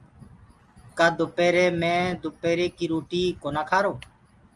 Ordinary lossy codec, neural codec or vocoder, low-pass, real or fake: Opus, 32 kbps; none; 10.8 kHz; real